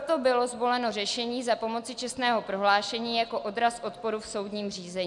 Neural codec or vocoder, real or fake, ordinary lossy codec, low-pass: none; real; MP3, 96 kbps; 10.8 kHz